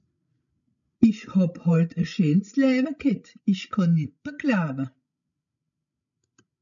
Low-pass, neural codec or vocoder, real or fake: 7.2 kHz; codec, 16 kHz, 16 kbps, FreqCodec, larger model; fake